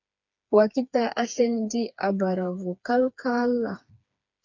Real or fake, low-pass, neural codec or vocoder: fake; 7.2 kHz; codec, 16 kHz, 4 kbps, FreqCodec, smaller model